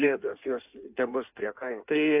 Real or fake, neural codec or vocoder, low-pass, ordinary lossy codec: fake; codec, 16 kHz in and 24 kHz out, 1.1 kbps, FireRedTTS-2 codec; 3.6 kHz; AAC, 32 kbps